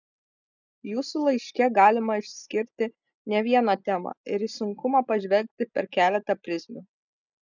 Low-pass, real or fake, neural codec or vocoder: 7.2 kHz; real; none